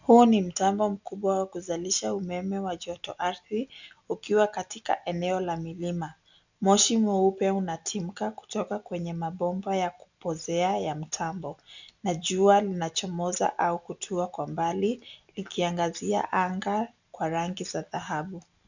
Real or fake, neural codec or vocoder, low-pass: real; none; 7.2 kHz